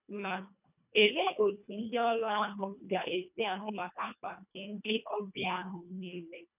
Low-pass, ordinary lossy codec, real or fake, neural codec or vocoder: 3.6 kHz; none; fake; codec, 24 kHz, 1.5 kbps, HILCodec